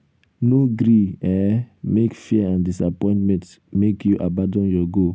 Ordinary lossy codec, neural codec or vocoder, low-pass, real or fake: none; none; none; real